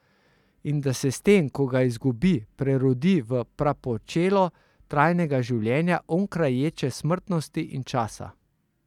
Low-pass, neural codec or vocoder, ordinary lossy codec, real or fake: 19.8 kHz; none; none; real